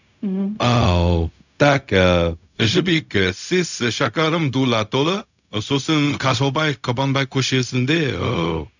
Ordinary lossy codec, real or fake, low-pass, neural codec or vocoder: none; fake; 7.2 kHz; codec, 16 kHz, 0.4 kbps, LongCat-Audio-Codec